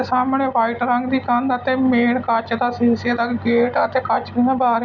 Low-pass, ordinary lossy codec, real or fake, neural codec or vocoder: 7.2 kHz; none; real; none